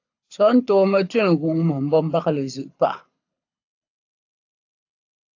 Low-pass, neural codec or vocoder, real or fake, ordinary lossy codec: 7.2 kHz; codec, 24 kHz, 6 kbps, HILCodec; fake; AAC, 48 kbps